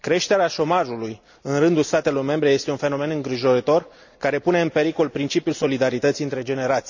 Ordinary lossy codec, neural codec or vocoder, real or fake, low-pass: none; none; real; 7.2 kHz